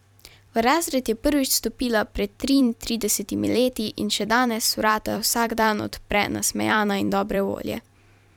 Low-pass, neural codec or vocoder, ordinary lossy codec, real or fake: 19.8 kHz; vocoder, 44.1 kHz, 128 mel bands every 256 samples, BigVGAN v2; none; fake